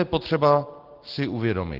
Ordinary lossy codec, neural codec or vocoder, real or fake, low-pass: Opus, 16 kbps; none; real; 5.4 kHz